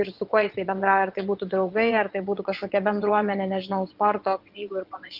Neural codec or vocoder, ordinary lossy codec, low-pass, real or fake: vocoder, 22.05 kHz, 80 mel bands, WaveNeXt; Opus, 24 kbps; 5.4 kHz; fake